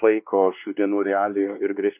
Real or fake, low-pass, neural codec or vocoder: fake; 3.6 kHz; codec, 16 kHz, 2 kbps, X-Codec, WavLM features, trained on Multilingual LibriSpeech